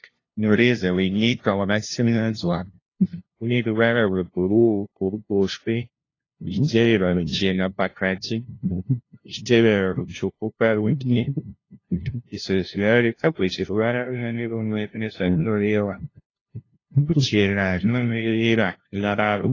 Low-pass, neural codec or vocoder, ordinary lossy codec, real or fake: 7.2 kHz; codec, 16 kHz, 0.5 kbps, FunCodec, trained on LibriTTS, 25 frames a second; AAC, 32 kbps; fake